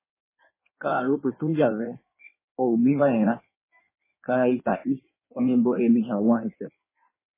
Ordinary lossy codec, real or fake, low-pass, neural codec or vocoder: MP3, 16 kbps; fake; 3.6 kHz; codec, 16 kHz in and 24 kHz out, 1.1 kbps, FireRedTTS-2 codec